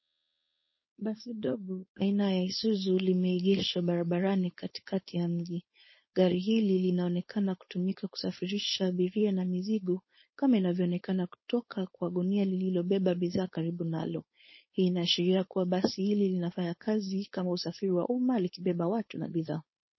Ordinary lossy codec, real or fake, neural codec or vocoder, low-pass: MP3, 24 kbps; fake; codec, 16 kHz, 4.8 kbps, FACodec; 7.2 kHz